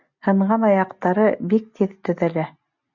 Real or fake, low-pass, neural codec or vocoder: real; 7.2 kHz; none